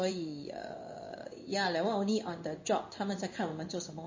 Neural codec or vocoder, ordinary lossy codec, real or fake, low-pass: codec, 16 kHz in and 24 kHz out, 1 kbps, XY-Tokenizer; MP3, 32 kbps; fake; 7.2 kHz